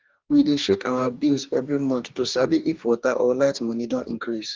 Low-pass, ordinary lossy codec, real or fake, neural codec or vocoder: 7.2 kHz; Opus, 32 kbps; fake; codec, 44.1 kHz, 2.6 kbps, DAC